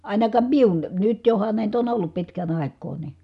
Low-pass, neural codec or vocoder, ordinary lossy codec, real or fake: 10.8 kHz; none; none; real